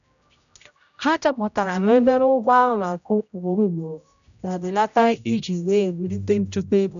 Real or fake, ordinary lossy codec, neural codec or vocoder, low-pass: fake; none; codec, 16 kHz, 0.5 kbps, X-Codec, HuBERT features, trained on general audio; 7.2 kHz